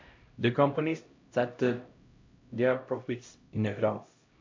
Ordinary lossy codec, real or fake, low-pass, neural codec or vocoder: MP3, 48 kbps; fake; 7.2 kHz; codec, 16 kHz, 0.5 kbps, X-Codec, HuBERT features, trained on LibriSpeech